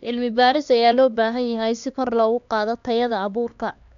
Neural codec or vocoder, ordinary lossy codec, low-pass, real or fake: codec, 16 kHz, 2 kbps, X-Codec, HuBERT features, trained on LibriSpeech; none; 7.2 kHz; fake